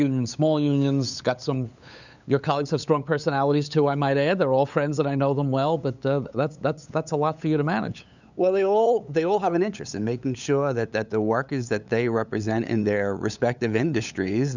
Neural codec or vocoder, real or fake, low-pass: codec, 16 kHz, 8 kbps, FunCodec, trained on LibriTTS, 25 frames a second; fake; 7.2 kHz